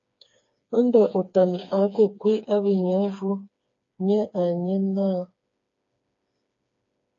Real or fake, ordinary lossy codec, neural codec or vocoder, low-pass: fake; AAC, 48 kbps; codec, 16 kHz, 4 kbps, FreqCodec, smaller model; 7.2 kHz